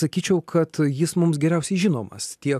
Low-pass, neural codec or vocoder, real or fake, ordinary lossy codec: 14.4 kHz; none; real; AAC, 96 kbps